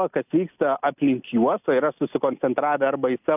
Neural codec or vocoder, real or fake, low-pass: none; real; 3.6 kHz